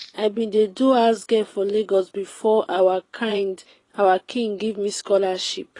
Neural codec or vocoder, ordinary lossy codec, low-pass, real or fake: vocoder, 24 kHz, 100 mel bands, Vocos; AAC, 32 kbps; 10.8 kHz; fake